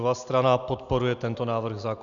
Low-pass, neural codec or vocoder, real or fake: 7.2 kHz; none; real